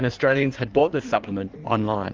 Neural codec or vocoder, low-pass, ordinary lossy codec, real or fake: codec, 16 kHz in and 24 kHz out, 1.1 kbps, FireRedTTS-2 codec; 7.2 kHz; Opus, 32 kbps; fake